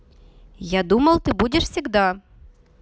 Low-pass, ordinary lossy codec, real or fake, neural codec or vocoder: none; none; real; none